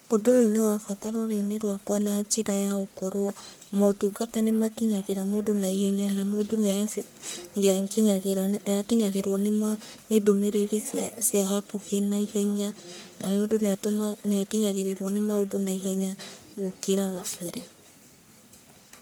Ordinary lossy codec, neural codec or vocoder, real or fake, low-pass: none; codec, 44.1 kHz, 1.7 kbps, Pupu-Codec; fake; none